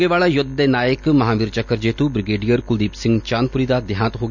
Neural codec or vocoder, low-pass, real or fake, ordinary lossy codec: none; 7.2 kHz; real; none